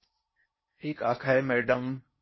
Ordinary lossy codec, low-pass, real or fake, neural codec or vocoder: MP3, 24 kbps; 7.2 kHz; fake; codec, 16 kHz in and 24 kHz out, 0.6 kbps, FocalCodec, streaming, 4096 codes